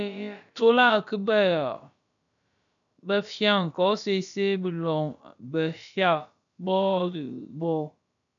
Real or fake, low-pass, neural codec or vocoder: fake; 7.2 kHz; codec, 16 kHz, about 1 kbps, DyCAST, with the encoder's durations